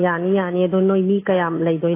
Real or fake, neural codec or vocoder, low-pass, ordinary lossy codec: real; none; 3.6 kHz; AAC, 24 kbps